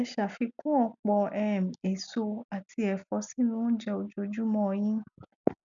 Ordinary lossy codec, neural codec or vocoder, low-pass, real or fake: none; none; 7.2 kHz; real